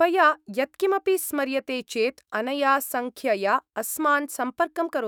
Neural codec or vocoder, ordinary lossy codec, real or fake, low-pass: autoencoder, 48 kHz, 128 numbers a frame, DAC-VAE, trained on Japanese speech; none; fake; none